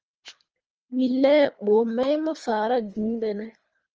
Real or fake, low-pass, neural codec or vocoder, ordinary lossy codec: fake; 7.2 kHz; codec, 24 kHz, 3 kbps, HILCodec; Opus, 24 kbps